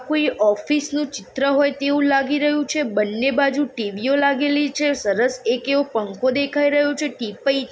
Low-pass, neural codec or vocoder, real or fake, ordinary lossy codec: none; none; real; none